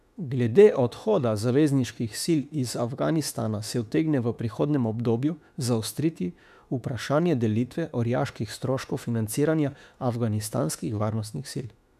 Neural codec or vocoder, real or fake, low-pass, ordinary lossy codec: autoencoder, 48 kHz, 32 numbers a frame, DAC-VAE, trained on Japanese speech; fake; 14.4 kHz; none